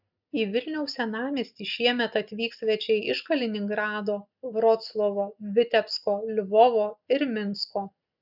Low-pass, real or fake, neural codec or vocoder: 5.4 kHz; real; none